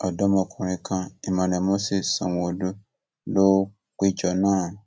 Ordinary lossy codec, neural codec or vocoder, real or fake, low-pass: none; none; real; none